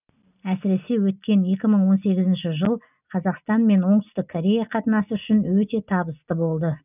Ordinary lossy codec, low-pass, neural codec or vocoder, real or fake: none; 3.6 kHz; none; real